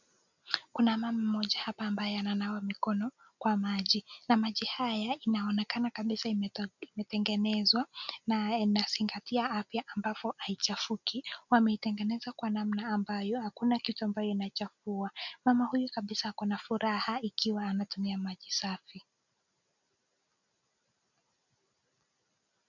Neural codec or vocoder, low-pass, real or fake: none; 7.2 kHz; real